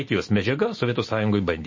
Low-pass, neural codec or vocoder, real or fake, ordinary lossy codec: 7.2 kHz; none; real; MP3, 32 kbps